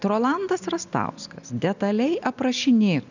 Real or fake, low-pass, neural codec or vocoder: real; 7.2 kHz; none